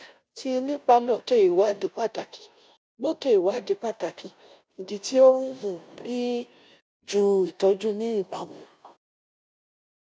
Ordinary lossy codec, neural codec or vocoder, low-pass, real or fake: none; codec, 16 kHz, 0.5 kbps, FunCodec, trained on Chinese and English, 25 frames a second; none; fake